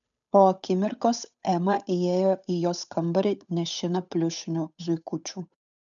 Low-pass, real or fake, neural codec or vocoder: 7.2 kHz; fake; codec, 16 kHz, 8 kbps, FunCodec, trained on Chinese and English, 25 frames a second